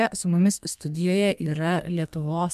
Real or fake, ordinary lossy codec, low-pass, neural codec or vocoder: fake; MP3, 96 kbps; 14.4 kHz; codec, 32 kHz, 1.9 kbps, SNAC